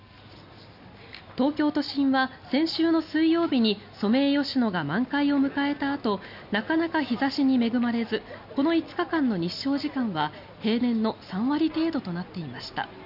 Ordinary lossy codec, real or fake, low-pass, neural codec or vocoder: none; real; 5.4 kHz; none